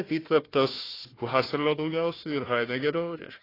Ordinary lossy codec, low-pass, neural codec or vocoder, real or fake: AAC, 24 kbps; 5.4 kHz; codec, 16 kHz, 1 kbps, FunCodec, trained on Chinese and English, 50 frames a second; fake